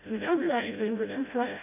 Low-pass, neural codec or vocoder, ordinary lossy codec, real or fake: 3.6 kHz; codec, 16 kHz, 0.5 kbps, FreqCodec, smaller model; none; fake